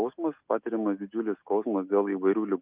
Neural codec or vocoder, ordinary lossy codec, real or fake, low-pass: none; Opus, 32 kbps; real; 3.6 kHz